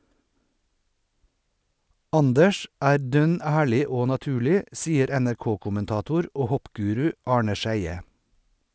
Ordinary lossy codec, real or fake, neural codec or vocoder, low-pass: none; real; none; none